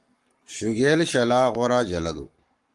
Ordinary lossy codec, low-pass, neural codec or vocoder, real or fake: Opus, 32 kbps; 10.8 kHz; codec, 44.1 kHz, 7.8 kbps, Pupu-Codec; fake